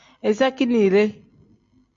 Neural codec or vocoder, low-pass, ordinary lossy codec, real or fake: none; 7.2 kHz; MP3, 48 kbps; real